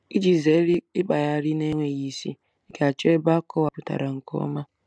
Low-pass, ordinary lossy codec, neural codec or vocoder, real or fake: none; none; none; real